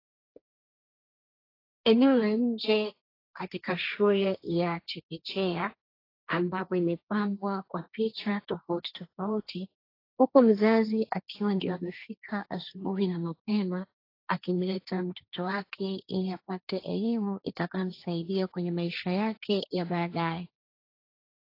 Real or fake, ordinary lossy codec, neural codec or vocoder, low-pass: fake; AAC, 32 kbps; codec, 16 kHz, 1.1 kbps, Voila-Tokenizer; 5.4 kHz